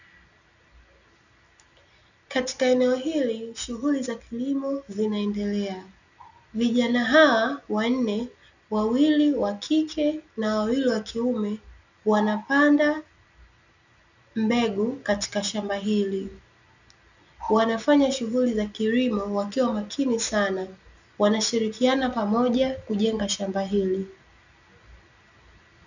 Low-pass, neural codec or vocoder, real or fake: 7.2 kHz; none; real